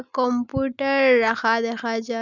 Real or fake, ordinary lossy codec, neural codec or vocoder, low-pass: real; none; none; 7.2 kHz